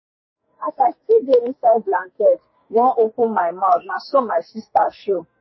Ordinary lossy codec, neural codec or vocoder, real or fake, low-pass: MP3, 24 kbps; codec, 44.1 kHz, 2.6 kbps, SNAC; fake; 7.2 kHz